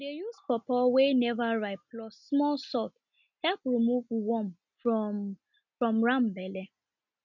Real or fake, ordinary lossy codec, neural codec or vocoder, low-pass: real; none; none; 7.2 kHz